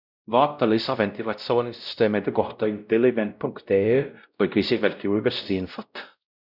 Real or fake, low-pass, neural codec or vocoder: fake; 5.4 kHz; codec, 16 kHz, 0.5 kbps, X-Codec, WavLM features, trained on Multilingual LibriSpeech